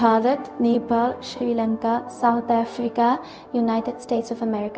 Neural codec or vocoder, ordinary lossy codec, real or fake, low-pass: codec, 16 kHz, 0.4 kbps, LongCat-Audio-Codec; none; fake; none